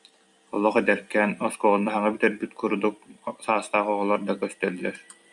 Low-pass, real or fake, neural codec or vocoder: 10.8 kHz; fake; vocoder, 44.1 kHz, 128 mel bands every 256 samples, BigVGAN v2